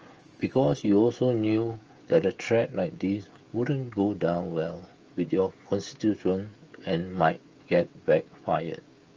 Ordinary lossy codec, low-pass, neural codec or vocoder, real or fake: Opus, 24 kbps; 7.2 kHz; codec, 16 kHz, 16 kbps, FreqCodec, smaller model; fake